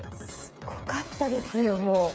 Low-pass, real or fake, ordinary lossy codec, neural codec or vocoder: none; fake; none; codec, 16 kHz, 8 kbps, FreqCodec, smaller model